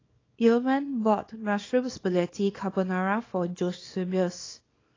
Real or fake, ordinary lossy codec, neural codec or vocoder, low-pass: fake; AAC, 32 kbps; codec, 24 kHz, 0.9 kbps, WavTokenizer, small release; 7.2 kHz